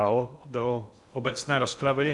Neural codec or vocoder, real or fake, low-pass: codec, 16 kHz in and 24 kHz out, 0.6 kbps, FocalCodec, streaming, 2048 codes; fake; 10.8 kHz